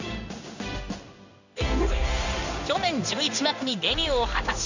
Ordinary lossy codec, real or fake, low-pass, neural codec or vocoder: none; fake; 7.2 kHz; codec, 16 kHz in and 24 kHz out, 1 kbps, XY-Tokenizer